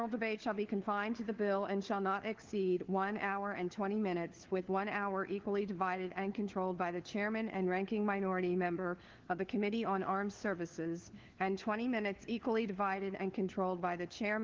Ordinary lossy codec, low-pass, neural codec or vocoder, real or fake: Opus, 16 kbps; 7.2 kHz; codec, 16 kHz, 4 kbps, FunCodec, trained on LibriTTS, 50 frames a second; fake